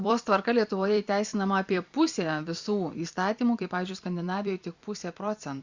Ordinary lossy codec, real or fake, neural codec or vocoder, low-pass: Opus, 64 kbps; fake; vocoder, 44.1 kHz, 128 mel bands every 256 samples, BigVGAN v2; 7.2 kHz